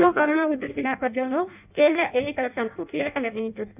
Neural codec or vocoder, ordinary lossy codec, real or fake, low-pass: codec, 16 kHz in and 24 kHz out, 0.6 kbps, FireRedTTS-2 codec; none; fake; 3.6 kHz